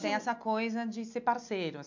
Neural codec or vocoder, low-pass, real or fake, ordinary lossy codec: none; 7.2 kHz; real; MP3, 64 kbps